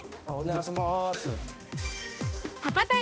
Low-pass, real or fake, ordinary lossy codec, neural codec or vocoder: none; fake; none; codec, 16 kHz, 1 kbps, X-Codec, HuBERT features, trained on balanced general audio